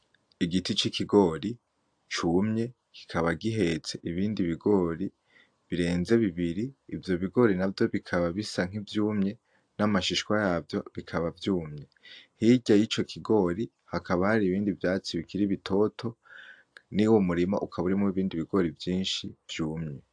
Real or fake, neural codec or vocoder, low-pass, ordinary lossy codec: real; none; 9.9 kHz; AAC, 64 kbps